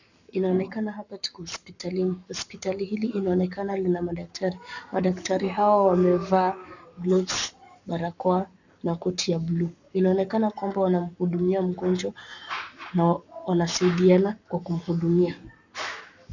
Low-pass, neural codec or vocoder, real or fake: 7.2 kHz; codec, 44.1 kHz, 7.8 kbps, Pupu-Codec; fake